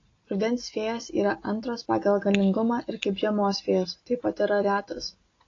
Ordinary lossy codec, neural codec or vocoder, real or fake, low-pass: AAC, 32 kbps; none; real; 7.2 kHz